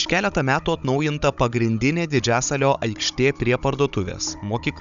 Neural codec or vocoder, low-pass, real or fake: codec, 16 kHz, 16 kbps, FunCodec, trained on Chinese and English, 50 frames a second; 7.2 kHz; fake